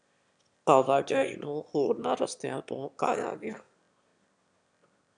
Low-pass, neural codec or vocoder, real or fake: 9.9 kHz; autoencoder, 22.05 kHz, a latent of 192 numbers a frame, VITS, trained on one speaker; fake